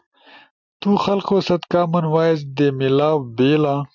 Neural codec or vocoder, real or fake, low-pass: none; real; 7.2 kHz